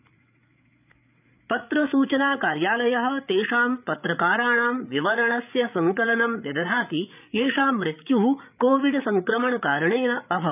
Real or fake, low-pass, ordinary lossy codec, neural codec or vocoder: fake; 3.6 kHz; none; codec, 16 kHz, 8 kbps, FreqCodec, larger model